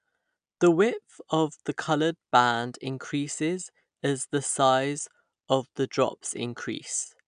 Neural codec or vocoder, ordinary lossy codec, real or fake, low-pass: none; AAC, 96 kbps; real; 9.9 kHz